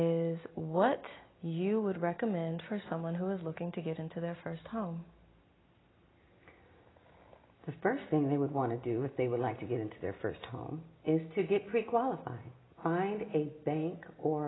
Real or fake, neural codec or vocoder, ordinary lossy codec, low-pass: real; none; AAC, 16 kbps; 7.2 kHz